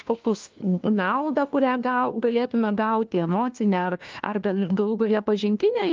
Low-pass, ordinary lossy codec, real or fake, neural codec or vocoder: 7.2 kHz; Opus, 32 kbps; fake; codec, 16 kHz, 1 kbps, FunCodec, trained on LibriTTS, 50 frames a second